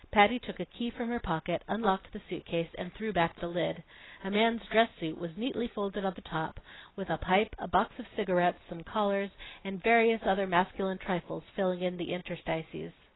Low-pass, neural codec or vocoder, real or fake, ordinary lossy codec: 7.2 kHz; none; real; AAC, 16 kbps